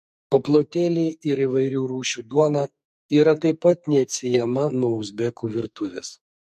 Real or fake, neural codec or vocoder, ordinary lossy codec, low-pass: fake; codec, 44.1 kHz, 2.6 kbps, SNAC; MP3, 64 kbps; 14.4 kHz